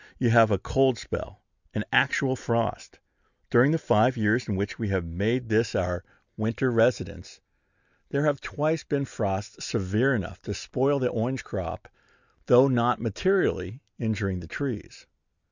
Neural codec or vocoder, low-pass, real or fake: none; 7.2 kHz; real